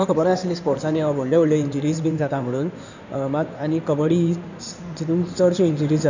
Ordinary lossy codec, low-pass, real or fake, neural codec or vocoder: none; 7.2 kHz; fake; codec, 16 kHz in and 24 kHz out, 2.2 kbps, FireRedTTS-2 codec